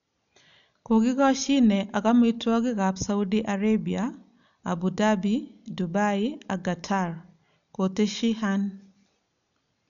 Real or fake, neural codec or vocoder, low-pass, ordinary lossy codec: real; none; 7.2 kHz; none